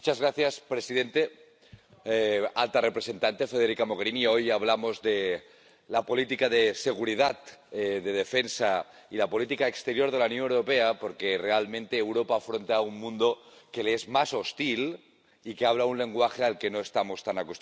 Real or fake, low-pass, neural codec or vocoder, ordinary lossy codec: real; none; none; none